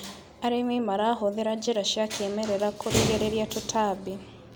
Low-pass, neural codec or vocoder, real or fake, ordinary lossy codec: none; none; real; none